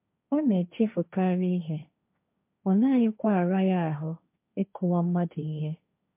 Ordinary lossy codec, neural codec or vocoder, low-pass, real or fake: MP3, 32 kbps; codec, 16 kHz, 1.1 kbps, Voila-Tokenizer; 3.6 kHz; fake